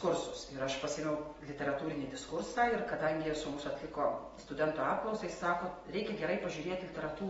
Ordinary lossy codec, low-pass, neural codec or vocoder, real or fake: AAC, 24 kbps; 19.8 kHz; none; real